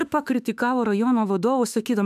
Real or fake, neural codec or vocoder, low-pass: fake; autoencoder, 48 kHz, 32 numbers a frame, DAC-VAE, trained on Japanese speech; 14.4 kHz